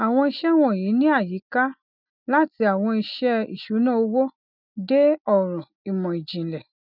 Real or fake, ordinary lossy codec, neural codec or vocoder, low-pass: real; none; none; 5.4 kHz